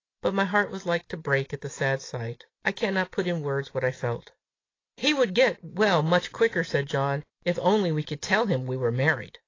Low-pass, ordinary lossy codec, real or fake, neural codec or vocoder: 7.2 kHz; AAC, 32 kbps; real; none